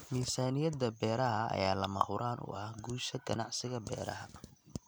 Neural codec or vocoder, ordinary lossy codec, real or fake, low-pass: none; none; real; none